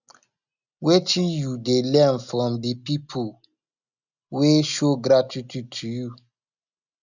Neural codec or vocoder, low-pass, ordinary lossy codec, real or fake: none; 7.2 kHz; none; real